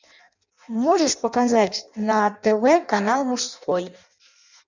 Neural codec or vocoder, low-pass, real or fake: codec, 16 kHz in and 24 kHz out, 0.6 kbps, FireRedTTS-2 codec; 7.2 kHz; fake